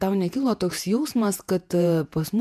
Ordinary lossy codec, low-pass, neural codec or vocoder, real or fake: MP3, 96 kbps; 14.4 kHz; vocoder, 48 kHz, 128 mel bands, Vocos; fake